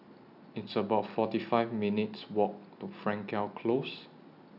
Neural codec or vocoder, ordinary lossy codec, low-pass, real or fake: none; none; 5.4 kHz; real